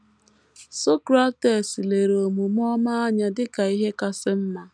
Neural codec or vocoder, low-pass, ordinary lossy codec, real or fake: none; 9.9 kHz; none; real